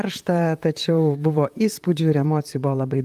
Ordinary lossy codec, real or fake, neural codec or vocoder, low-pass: Opus, 32 kbps; fake; vocoder, 44.1 kHz, 128 mel bands, Pupu-Vocoder; 14.4 kHz